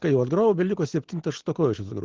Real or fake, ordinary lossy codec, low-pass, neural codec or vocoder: real; Opus, 16 kbps; 7.2 kHz; none